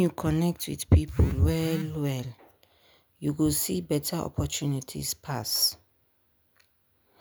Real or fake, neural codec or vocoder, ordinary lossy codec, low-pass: real; none; none; none